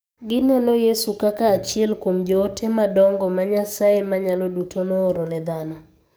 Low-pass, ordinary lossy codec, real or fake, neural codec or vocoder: none; none; fake; codec, 44.1 kHz, 7.8 kbps, DAC